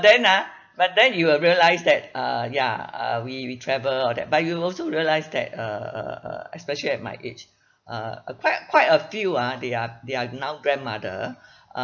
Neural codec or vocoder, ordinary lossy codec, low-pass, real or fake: none; none; 7.2 kHz; real